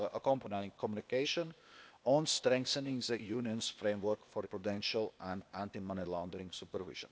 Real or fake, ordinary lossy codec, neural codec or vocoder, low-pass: fake; none; codec, 16 kHz, 0.8 kbps, ZipCodec; none